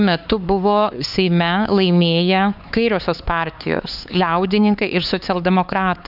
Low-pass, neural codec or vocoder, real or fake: 5.4 kHz; codec, 16 kHz, 4 kbps, X-Codec, HuBERT features, trained on LibriSpeech; fake